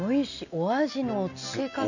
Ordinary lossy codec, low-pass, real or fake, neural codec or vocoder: none; 7.2 kHz; real; none